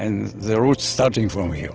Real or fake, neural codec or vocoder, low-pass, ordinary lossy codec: real; none; 7.2 kHz; Opus, 16 kbps